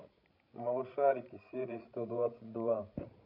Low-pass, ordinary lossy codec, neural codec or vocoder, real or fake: 5.4 kHz; none; codec, 16 kHz, 16 kbps, FreqCodec, larger model; fake